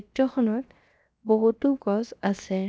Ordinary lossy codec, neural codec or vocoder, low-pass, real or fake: none; codec, 16 kHz, 0.7 kbps, FocalCodec; none; fake